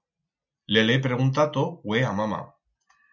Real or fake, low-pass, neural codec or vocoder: real; 7.2 kHz; none